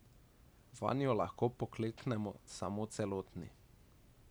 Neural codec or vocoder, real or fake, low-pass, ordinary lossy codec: none; real; none; none